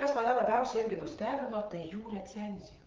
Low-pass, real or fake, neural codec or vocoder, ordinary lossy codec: 7.2 kHz; fake; codec, 16 kHz, 4 kbps, FreqCodec, larger model; Opus, 24 kbps